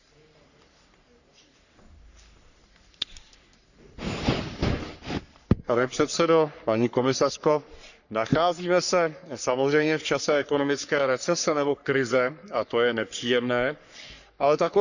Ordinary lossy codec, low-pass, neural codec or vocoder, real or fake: none; 7.2 kHz; codec, 44.1 kHz, 3.4 kbps, Pupu-Codec; fake